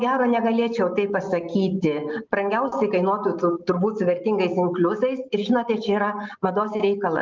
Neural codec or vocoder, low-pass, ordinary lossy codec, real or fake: none; 7.2 kHz; Opus, 24 kbps; real